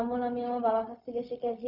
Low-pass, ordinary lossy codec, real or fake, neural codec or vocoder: 5.4 kHz; none; fake; codec, 16 kHz, 0.4 kbps, LongCat-Audio-Codec